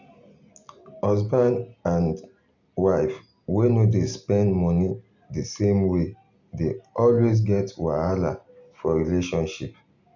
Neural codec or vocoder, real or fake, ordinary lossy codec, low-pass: none; real; none; 7.2 kHz